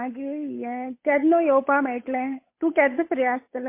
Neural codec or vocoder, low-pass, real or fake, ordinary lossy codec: codec, 16 kHz, 8 kbps, FunCodec, trained on Chinese and English, 25 frames a second; 3.6 kHz; fake; MP3, 24 kbps